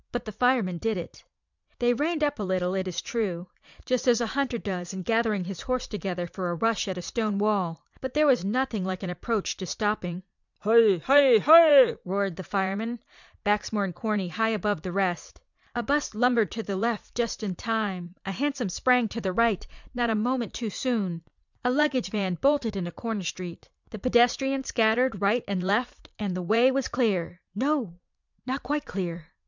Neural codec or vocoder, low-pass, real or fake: vocoder, 44.1 kHz, 80 mel bands, Vocos; 7.2 kHz; fake